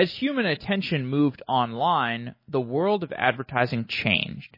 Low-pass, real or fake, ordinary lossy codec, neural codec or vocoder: 5.4 kHz; real; MP3, 24 kbps; none